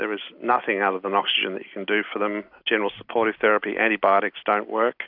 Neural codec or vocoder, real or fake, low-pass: none; real; 5.4 kHz